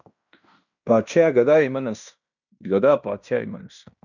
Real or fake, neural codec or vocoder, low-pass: fake; codec, 16 kHz in and 24 kHz out, 0.9 kbps, LongCat-Audio-Codec, fine tuned four codebook decoder; 7.2 kHz